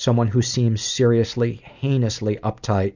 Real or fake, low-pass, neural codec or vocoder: real; 7.2 kHz; none